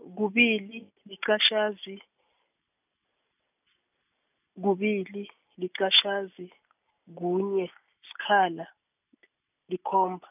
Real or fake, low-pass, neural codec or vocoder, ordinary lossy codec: real; 3.6 kHz; none; none